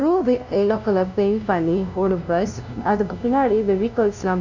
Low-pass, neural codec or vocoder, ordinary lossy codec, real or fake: 7.2 kHz; codec, 16 kHz, 0.5 kbps, FunCodec, trained on LibriTTS, 25 frames a second; none; fake